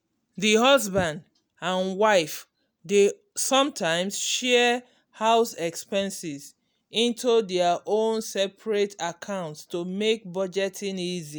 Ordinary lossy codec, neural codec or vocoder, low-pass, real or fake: none; none; none; real